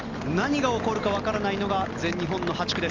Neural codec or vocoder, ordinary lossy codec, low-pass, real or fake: none; Opus, 32 kbps; 7.2 kHz; real